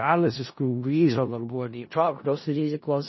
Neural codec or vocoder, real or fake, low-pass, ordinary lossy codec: codec, 16 kHz in and 24 kHz out, 0.4 kbps, LongCat-Audio-Codec, four codebook decoder; fake; 7.2 kHz; MP3, 24 kbps